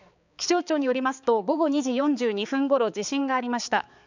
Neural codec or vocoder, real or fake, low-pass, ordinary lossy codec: codec, 16 kHz, 4 kbps, X-Codec, HuBERT features, trained on balanced general audio; fake; 7.2 kHz; none